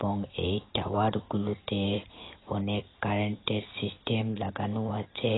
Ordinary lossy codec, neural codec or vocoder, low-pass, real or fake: AAC, 16 kbps; none; 7.2 kHz; real